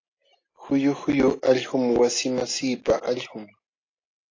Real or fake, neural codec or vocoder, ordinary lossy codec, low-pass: real; none; AAC, 32 kbps; 7.2 kHz